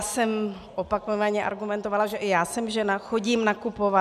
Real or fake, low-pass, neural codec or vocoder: real; 14.4 kHz; none